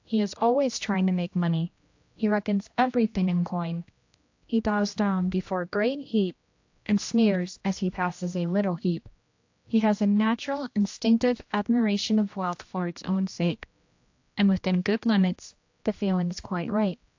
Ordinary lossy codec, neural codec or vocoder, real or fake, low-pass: AAC, 48 kbps; codec, 16 kHz, 1 kbps, X-Codec, HuBERT features, trained on general audio; fake; 7.2 kHz